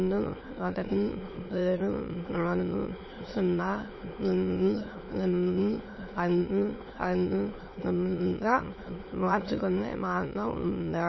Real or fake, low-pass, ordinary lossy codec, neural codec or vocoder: fake; 7.2 kHz; MP3, 24 kbps; autoencoder, 22.05 kHz, a latent of 192 numbers a frame, VITS, trained on many speakers